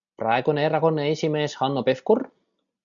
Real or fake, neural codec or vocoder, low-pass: real; none; 7.2 kHz